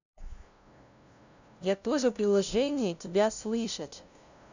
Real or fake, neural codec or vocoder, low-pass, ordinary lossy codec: fake; codec, 16 kHz, 0.5 kbps, FunCodec, trained on LibriTTS, 25 frames a second; 7.2 kHz; none